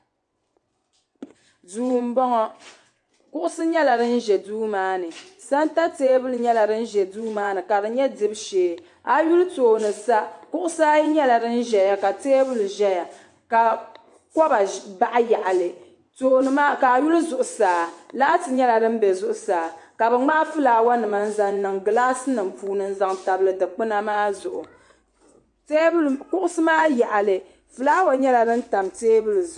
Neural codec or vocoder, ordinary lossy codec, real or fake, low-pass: vocoder, 24 kHz, 100 mel bands, Vocos; AAC, 64 kbps; fake; 10.8 kHz